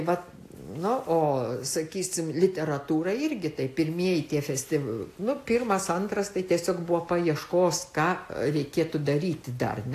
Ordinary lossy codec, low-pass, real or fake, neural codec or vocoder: AAC, 64 kbps; 14.4 kHz; real; none